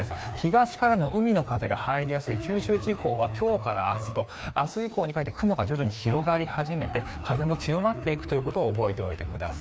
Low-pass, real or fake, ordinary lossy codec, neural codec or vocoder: none; fake; none; codec, 16 kHz, 2 kbps, FreqCodec, larger model